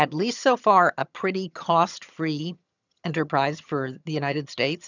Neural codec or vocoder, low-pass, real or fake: vocoder, 22.05 kHz, 80 mel bands, HiFi-GAN; 7.2 kHz; fake